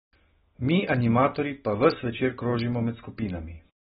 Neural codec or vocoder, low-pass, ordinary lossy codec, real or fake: none; 19.8 kHz; AAC, 16 kbps; real